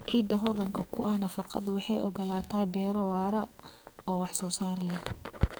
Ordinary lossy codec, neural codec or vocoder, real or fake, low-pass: none; codec, 44.1 kHz, 2.6 kbps, SNAC; fake; none